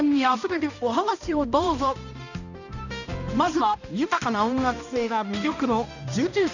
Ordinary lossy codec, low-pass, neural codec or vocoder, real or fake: none; 7.2 kHz; codec, 16 kHz, 1 kbps, X-Codec, HuBERT features, trained on balanced general audio; fake